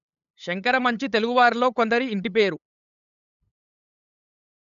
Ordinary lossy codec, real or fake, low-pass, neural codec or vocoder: none; fake; 7.2 kHz; codec, 16 kHz, 8 kbps, FunCodec, trained on LibriTTS, 25 frames a second